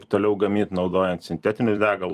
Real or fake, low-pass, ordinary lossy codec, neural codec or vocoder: fake; 14.4 kHz; Opus, 32 kbps; vocoder, 44.1 kHz, 128 mel bands every 256 samples, BigVGAN v2